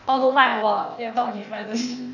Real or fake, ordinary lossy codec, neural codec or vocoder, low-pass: fake; none; codec, 16 kHz, 0.8 kbps, ZipCodec; 7.2 kHz